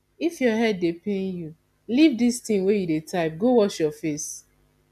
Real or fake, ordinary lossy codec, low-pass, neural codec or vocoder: real; none; 14.4 kHz; none